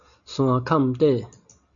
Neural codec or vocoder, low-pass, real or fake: none; 7.2 kHz; real